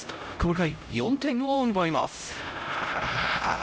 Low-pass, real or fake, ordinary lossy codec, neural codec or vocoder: none; fake; none; codec, 16 kHz, 0.5 kbps, X-Codec, HuBERT features, trained on LibriSpeech